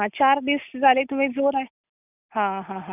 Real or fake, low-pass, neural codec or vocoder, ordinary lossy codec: real; 3.6 kHz; none; none